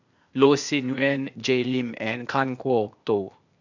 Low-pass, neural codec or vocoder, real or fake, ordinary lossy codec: 7.2 kHz; codec, 16 kHz, 0.8 kbps, ZipCodec; fake; none